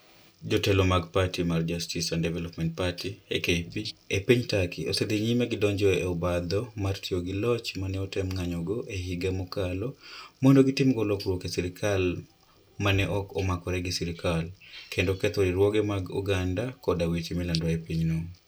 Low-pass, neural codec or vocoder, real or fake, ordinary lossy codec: none; none; real; none